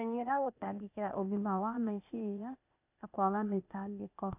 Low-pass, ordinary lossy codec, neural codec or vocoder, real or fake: 3.6 kHz; none; codec, 16 kHz, 0.8 kbps, ZipCodec; fake